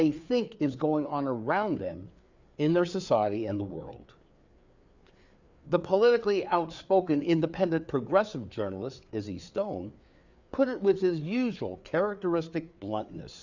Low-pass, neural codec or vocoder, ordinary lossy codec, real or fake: 7.2 kHz; codec, 16 kHz, 4 kbps, FreqCodec, larger model; Opus, 64 kbps; fake